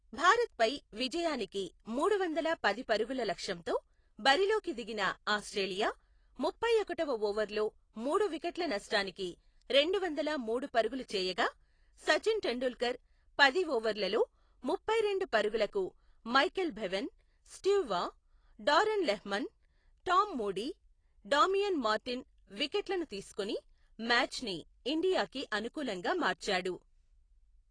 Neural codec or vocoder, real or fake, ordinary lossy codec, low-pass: none; real; AAC, 32 kbps; 9.9 kHz